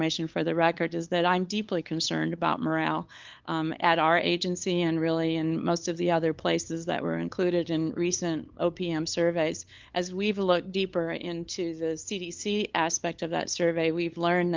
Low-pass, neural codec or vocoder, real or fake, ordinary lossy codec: 7.2 kHz; codec, 16 kHz, 8 kbps, FunCodec, trained on LibriTTS, 25 frames a second; fake; Opus, 24 kbps